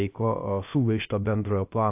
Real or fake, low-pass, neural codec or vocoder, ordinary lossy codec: fake; 3.6 kHz; codec, 16 kHz, 0.3 kbps, FocalCodec; AAC, 32 kbps